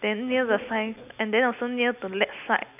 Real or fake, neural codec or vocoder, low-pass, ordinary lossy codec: real; none; 3.6 kHz; none